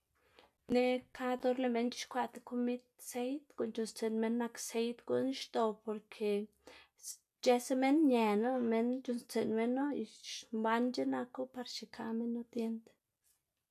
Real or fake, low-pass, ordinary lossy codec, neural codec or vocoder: real; 14.4 kHz; none; none